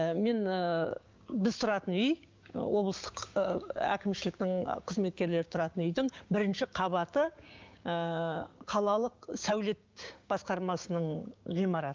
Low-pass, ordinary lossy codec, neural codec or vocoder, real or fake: 7.2 kHz; Opus, 24 kbps; codec, 16 kHz, 6 kbps, DAC; fake